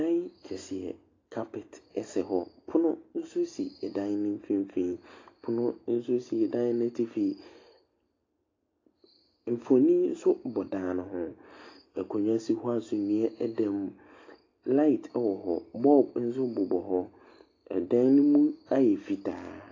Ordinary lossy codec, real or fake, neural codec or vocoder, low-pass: AAC, 32 kbps; real; none; 7.2 kHz